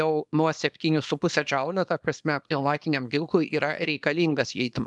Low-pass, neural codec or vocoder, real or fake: 10.8 kHz; codec, 24 kHz, 0.9 kbps, WavTokenizer, small release; fake